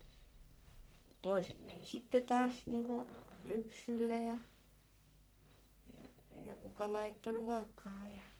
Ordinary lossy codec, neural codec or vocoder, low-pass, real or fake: none; codec, 44.1 kHz, 1.7 kbps, Pupu-Codec; none; fake